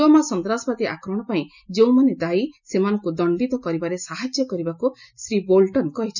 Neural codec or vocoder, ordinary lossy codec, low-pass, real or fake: none; none; 7.2 kHz; real